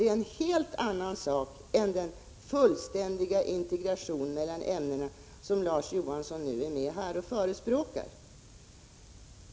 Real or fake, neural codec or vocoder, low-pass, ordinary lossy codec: real; none; none; none